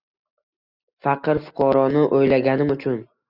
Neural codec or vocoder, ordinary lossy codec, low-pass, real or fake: none; Opus, 64 kbps; 5.4 kHz; real